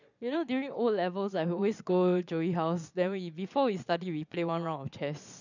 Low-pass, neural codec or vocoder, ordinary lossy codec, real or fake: 7.2 kHz; vocoder, 22.05 kHz, 80 mel bands, Vocos; none; fake